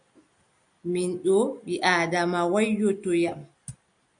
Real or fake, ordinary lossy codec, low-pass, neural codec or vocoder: real; MP3, 96 kbps; 9.9 kHz; none